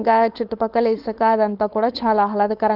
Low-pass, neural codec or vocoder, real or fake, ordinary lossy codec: 5.4 kHz; codec, 16 kHz, 4.8 kbps, FACodec; fake; Opus, 32 kbps